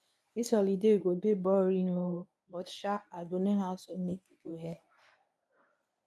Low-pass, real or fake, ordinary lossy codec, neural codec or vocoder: none; fake; none; codec, 24 kHz, 0.9 kbps, WavTokenizer, medium speech release version 1